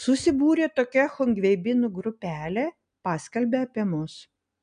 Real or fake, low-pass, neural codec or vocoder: real; 9.9 kHz; none